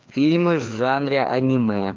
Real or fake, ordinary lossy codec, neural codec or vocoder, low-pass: fake; Opus, 24 kbps; codec, 16 kHz, 2 kbps, FreqCodec, larger model; 7.2 kHz